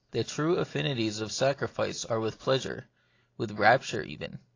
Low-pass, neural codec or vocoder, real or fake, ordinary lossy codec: 7.2 kHz; none; real; AAC, 32 kbps